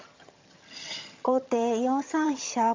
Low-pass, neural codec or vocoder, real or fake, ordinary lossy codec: 7.2 kHz; vocoder, 22.05 kHz, 80 mel bands, HiFi-GAN; fake; MP3, 64 kbps